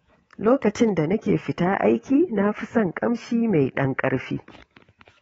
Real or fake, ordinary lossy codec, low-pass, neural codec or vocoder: fake; AAC, 24 kbps; 10.8 kHz; codec, 24 kHz, 3.1 kbps, DualCodec